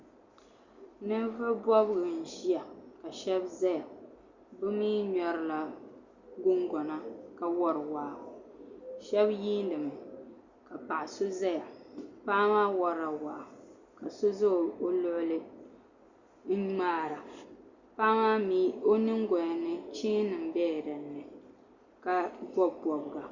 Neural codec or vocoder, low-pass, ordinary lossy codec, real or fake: none; 7.2 kHz; Opus, 64 kbps; real